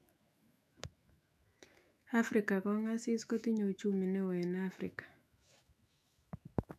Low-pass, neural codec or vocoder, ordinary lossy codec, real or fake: 14.4 kHz; autoencoder, 48 kHz, 128 numbers a frame, DAC-VAE, trained on Japanese speech; none; fake